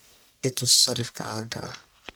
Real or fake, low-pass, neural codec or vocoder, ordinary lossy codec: fake; none; codec, 44.1 kHz, 1.7 kbps, Pupu-Codec; none